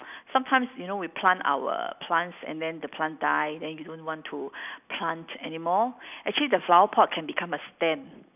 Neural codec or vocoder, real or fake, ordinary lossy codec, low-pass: none; real; none; 3.6 kHz